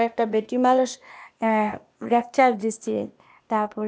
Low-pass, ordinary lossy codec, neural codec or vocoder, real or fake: none; none; codec, 16 kHz, 0.8 kbps, ZipCodec; fake